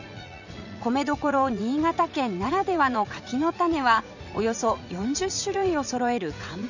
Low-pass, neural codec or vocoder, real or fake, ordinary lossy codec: 7.2 kHz; vocoder, 44.1 kHz, 80 mel bands, Vocos; fake; none